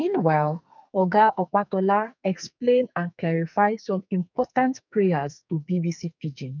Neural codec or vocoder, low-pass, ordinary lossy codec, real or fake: codec, 44.1 kHz, 2.6 kbps, SNAC; 7.2 kHz; none; fake